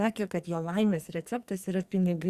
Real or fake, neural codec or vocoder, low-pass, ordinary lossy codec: fake; codec, 44.1 kHz, 2.6 kbps, SNAC; 14.4 kHz; Opus, 64 kbps